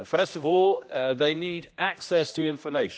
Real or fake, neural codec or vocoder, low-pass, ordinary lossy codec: fake; codec, 16 kHz, 1 kbps, X-Codec, HuBERT features, trained on general audio; none; none